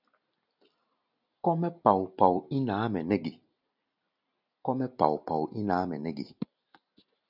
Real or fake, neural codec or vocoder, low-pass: real; none; 5.4 kHz